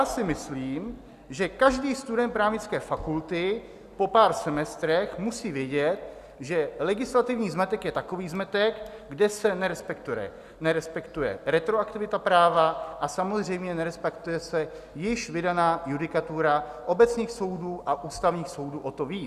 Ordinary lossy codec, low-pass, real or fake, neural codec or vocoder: Opus, 64 kbps; 14.4 kHz; real; none